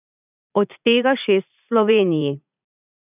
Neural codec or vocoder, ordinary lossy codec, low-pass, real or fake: vocoder, 44.1 kHz, 80 mel bands, Vocos; none; 3.6 kHz; fake